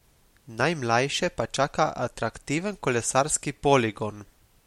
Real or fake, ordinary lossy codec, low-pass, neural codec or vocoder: real; MP3, 64 kbps; 19.8 kHz; none